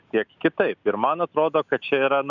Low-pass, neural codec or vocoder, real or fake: 7.2 kHz; none; real